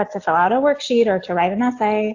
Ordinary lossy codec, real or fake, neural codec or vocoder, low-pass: AAC, 48 kbps; real; none; 7.2 kHz